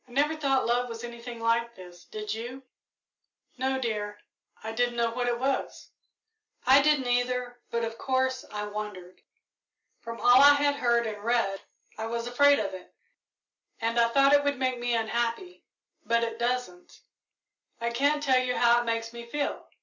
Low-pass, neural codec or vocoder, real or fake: 7.2 kHz; none; real